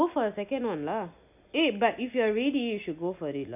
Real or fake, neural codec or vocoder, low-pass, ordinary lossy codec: real; none; 3.6 kHz; none